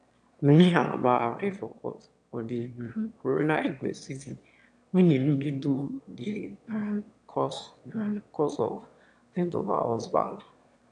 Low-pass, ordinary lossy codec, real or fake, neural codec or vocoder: 9.9 kHz; none; fake; autoencoder, 22.05 kHz, a latent of 192 numbers a frame, VITS, trained on one speaker